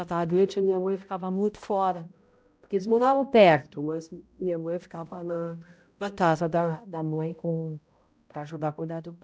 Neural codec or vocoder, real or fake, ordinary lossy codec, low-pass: codec, 16 kHz, 0.5 kbps, X-Codec, HuBERT features, trained on balanced general audio; fake; none; none